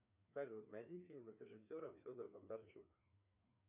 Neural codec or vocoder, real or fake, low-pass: codec, 16 kHz, 2 kbps, FreqCodec, larger model; fake; 3.6 kHz